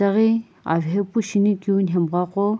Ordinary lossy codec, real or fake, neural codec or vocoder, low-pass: none; real; none; none